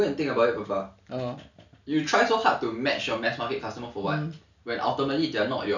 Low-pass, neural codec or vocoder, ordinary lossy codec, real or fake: 7.2 kHz; none; none; real